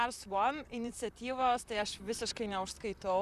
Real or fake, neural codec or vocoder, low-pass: fake; vocoder, 44.1 kHz, 128 mel bands, Pupu-Vocoder; 14.4 kHz